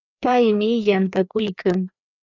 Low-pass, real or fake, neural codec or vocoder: 7.2 kHz; fake; codec, 16 kHz, 2 kbps, FreqCodec, larger model